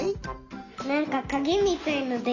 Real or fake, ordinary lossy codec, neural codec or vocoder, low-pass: real; none; none; 7.2 kHz